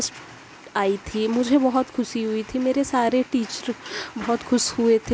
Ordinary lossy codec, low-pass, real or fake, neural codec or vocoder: none; none; real; none